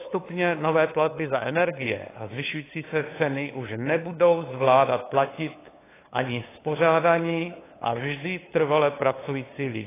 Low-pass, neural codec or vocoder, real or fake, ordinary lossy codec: 3.6 kHz; codec, 16 kHz, 8 kbps, FunCodec, trained on LibriTTS, 25 frames a second; fake; AAC, 16 kbps